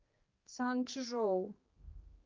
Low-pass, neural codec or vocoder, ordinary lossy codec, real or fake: 7.2 kHz; codec, 16 kHz, 2 kbps, X-Codec, HuBERT features, trained on general audio; Opus, 24 kbps; fake